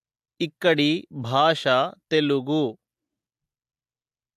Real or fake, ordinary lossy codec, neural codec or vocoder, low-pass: real; AAC, 96 kbps; none; 14.4 kHz